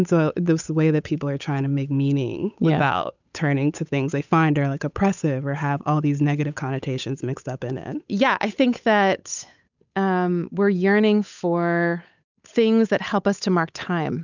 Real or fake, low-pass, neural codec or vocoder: fake; 7.2 kHz; codec, 16 kHz, 8 kbps, FunCodec, trained on Chinese and English, 25 frames a second